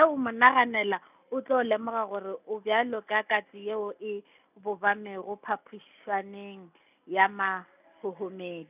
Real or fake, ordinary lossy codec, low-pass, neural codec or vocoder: real; none; 3.6 kHz; none